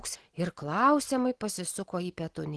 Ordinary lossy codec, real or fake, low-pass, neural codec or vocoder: Opus, 16 kbps; real; 10.8 kHz; none